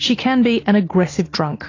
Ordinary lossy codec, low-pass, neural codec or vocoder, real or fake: AAC, 32 kbps; 7.2 kHz; none; real